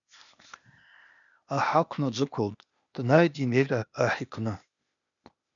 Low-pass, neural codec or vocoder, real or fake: 7.2 kHz; codec, 16 kHz, 0.8 kbps, ZipCodec; fake